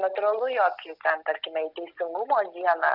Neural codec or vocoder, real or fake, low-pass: none; real; 5.4 kHz